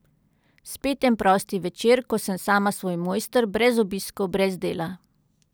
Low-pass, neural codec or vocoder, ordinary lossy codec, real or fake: none; none; none; real